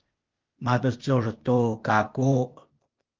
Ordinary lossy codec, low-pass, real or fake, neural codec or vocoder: Opus, 32 kbps; 7.2 kHz; fake; codec, 16 kHz, 0.8 kbps, ZipCodec